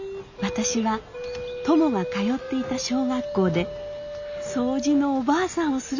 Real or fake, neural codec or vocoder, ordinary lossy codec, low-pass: real; none; none; 7.2 kHz